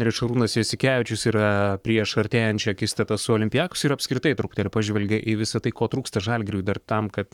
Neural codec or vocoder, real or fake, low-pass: codec, 44.1 kHz, 7.8 kbps, Pupu-Codec; fake; 19.8 kHz